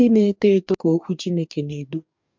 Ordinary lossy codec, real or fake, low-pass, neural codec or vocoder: MP3, 64 kbps; fake; 7.2 kHz; codec, 44.1 kHz, 2.6 kbps, DAC